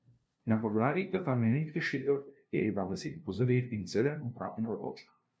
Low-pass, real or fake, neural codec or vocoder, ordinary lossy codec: none; fake; codec, 16 kHz, 0.5 kbps, FunCodec, trained on LibriTTS, 25 frames a second; none